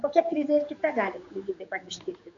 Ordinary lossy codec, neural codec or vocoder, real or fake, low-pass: AAC, 48 kbps; codec, 16 kHz, 4 kbps, X-Codec, HuBERT features, trained on general audio; fake; 7.2 kHz